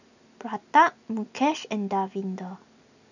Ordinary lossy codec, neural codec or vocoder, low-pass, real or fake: none; none; 7.2 kHz; real